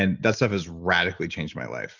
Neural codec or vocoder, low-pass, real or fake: none; 7.2 kHz; real